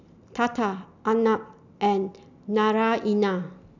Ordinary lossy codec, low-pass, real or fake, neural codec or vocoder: none; 7.2 kHz; real; none